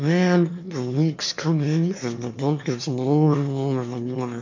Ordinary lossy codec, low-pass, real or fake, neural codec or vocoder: MP3, 48 kbps; 7.2 kHz; fake; autoencoder, 22.05 kHz, a latent of 192 numbers a frame, VITS, trained on one speaker